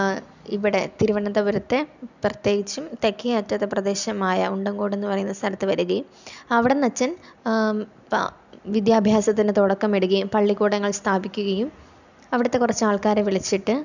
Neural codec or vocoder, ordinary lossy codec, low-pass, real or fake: none; none; 7.2 kHz; real